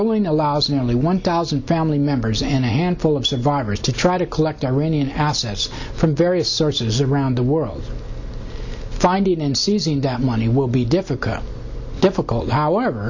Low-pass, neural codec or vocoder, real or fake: 7.2 kHz; none; real